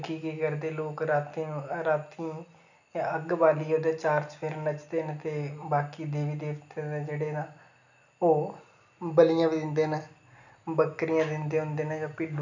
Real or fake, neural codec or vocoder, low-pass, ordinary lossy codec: real; none; 7.2 kHz; none